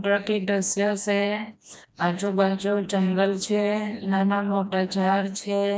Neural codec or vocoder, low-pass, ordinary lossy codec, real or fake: codec, 16 kHz, 1 kbps, FreqCodec, smaller model; none; none; fake